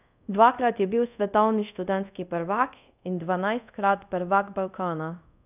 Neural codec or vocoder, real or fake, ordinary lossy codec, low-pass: codec, 24 kHz, 0.5 kbps, DualCodec; fake; none; 3.6 kHz